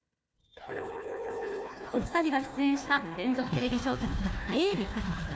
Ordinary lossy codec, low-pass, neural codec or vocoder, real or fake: none; none; codec, 16 kHz, 1 kbps, FunCodec, trained on Chinese and English, 50 frames a second; fake